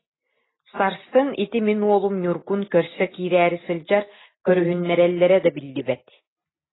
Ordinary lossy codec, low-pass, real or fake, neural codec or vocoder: AAC, 16 kbps; 7.2 kHz; real; none